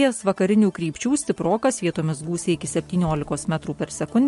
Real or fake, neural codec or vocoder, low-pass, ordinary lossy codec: real; none; 14.4 kHz; MP3, 48 kbps